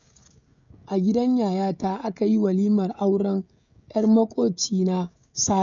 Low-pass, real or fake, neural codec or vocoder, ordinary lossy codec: 7.2 kHz; fake; codec, 16 kHz, 16 kbps, FreqCodec, smaller model; none